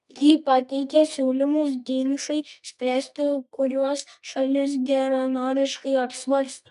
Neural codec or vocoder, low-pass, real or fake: codec, 24 kHz, 0.9 kbps, WavTokenizer, medium music audio release; 10.8 kHz; fake